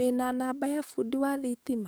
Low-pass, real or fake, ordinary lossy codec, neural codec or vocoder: none; fake; none; codec, 44.1 kHz, 7.8 kbps, DAC